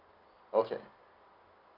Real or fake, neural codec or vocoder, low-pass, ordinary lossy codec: real; none; 5.4 kHz; none